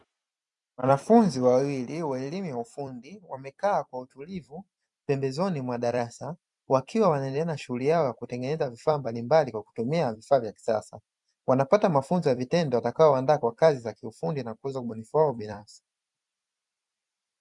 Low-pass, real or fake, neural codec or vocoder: 10.8 kHz; fake; vocoder, 44.1 kHz, 128 mel bands every 256 samples, BigVGAN v2